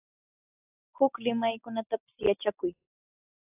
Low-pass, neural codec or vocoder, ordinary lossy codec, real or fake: 3.6 kHz; none; AAC, 24 kbps; real